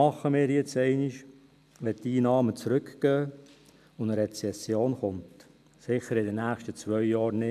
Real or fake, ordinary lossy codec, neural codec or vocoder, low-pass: real; none; none; 14.4 kHz